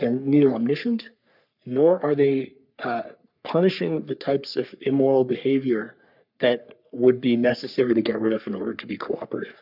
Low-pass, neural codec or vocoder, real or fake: 5.4 kHz; codec, 44.1 kHz, 3.4 kbps, Pupu-Codec; fake